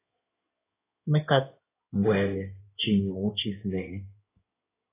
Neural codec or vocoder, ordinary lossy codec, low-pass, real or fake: codec, 16 kHz in and 24 kHz out, 2.2 kbps, FireRedTTS-2 codec; AAC, 16 kbps; 3.6 kHz; fake